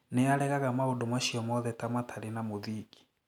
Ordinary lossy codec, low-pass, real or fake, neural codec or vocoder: none; 19.8 kHz; real; none